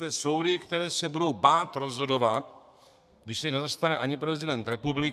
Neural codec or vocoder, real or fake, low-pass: codec, 32 kHz, 1.9 kbps, SNAC; fake; 14.4 kHz